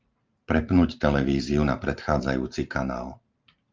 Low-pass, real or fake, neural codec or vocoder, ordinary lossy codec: 7.2 kHz; fake; vocoder, 44.1 kHz, 128 mel bands every 512 samples, BigVGAN v2; Opus, 24 kbps